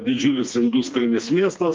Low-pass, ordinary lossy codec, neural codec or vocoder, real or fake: 7.2 kHz; Opus, 24 kbps; codec, 16 kHz, 2 kbps, FreqCodec, smaller model; fake